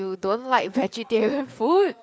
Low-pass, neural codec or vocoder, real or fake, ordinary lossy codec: none; codec, 16 kHz, 4 kbps, FreqCodec, larger model; fake; none